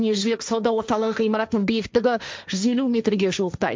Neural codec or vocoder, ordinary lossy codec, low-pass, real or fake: codec, 16 kHz, 1.1 kbps, Voila-Tokenizer; none; none; fake